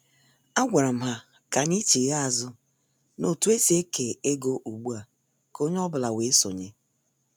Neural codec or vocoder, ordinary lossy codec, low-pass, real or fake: none; none; none; real